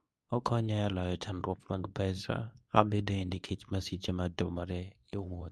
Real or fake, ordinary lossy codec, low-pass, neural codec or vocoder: fake; none; none; codec, 24 kHz, 0.9 kbps, WavTokenizer, medium speech release version 2